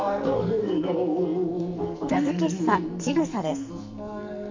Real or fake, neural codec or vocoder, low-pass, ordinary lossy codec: fake; codec, 44.1 kHz, 2.6 kbps, SNAC; 7.2 kHz; MP3, 64 kbps